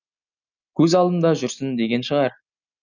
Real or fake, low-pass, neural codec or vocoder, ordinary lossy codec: real; 7.2 kHz; none; none